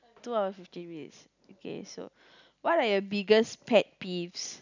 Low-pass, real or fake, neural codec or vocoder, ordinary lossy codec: 7.2 kHz; real; none; none